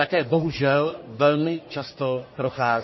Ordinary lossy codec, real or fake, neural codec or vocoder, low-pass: MP3, 24 kbps; fake; codec, 44.1 kHz, 1.7 kbps, Pupu-Codec; 7.2 kHz